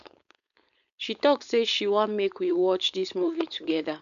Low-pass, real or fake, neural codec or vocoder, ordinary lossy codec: 7.2 kHz; fake; codec, 16 kHz, 4.8 kbps, FACodec; none